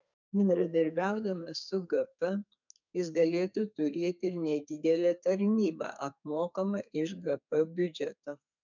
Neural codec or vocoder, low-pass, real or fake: codec, 32 kHz, 1.9 kbps, SNAC; 7.2 kHz; fake